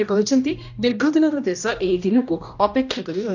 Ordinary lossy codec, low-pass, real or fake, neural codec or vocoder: none; 7.2 kHz; fake; codec, 16 kHz, 1 kbps, X-Codec, HuBERT features, trained on general audio